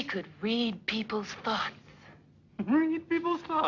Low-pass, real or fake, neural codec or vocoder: 7.2 kHz; real; none